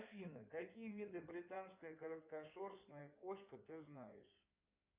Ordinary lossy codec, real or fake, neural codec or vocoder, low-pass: Opus, 64 kbps; fake; codec, 16 kHz in and 24 kHz out, 2.2 kbps, FireRedTTS-2 codec; 3.6 kHz